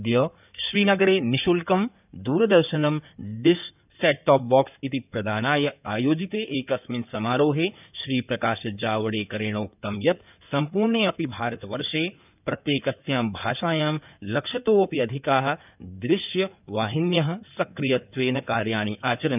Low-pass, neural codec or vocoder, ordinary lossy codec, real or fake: 3.6 kHz; codec, 16 kHz in and 24 kHz out, 2.2 kbps, FireRedTTS-2 codec; none; fake